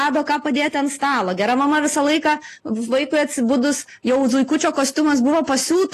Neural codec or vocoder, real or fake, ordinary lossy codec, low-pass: none; real; AAC, 48 kbps; 14.4 kHz